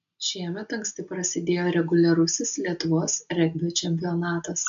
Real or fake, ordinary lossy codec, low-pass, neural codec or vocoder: real; AAC, 64 kbps; 7.2 kHz; none